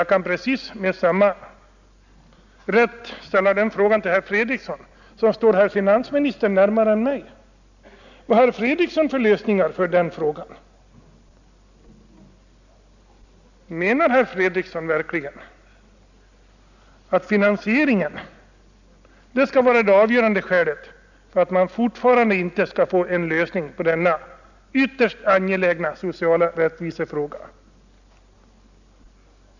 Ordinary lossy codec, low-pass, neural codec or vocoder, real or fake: none; 7.2 kHz; none; real